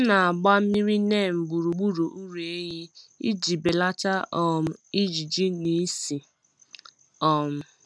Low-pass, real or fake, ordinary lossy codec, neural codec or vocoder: none; real; none; none